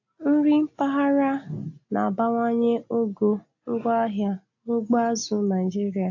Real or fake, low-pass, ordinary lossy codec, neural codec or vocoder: real; 7.2 kHz; AAC, 48 kbps; none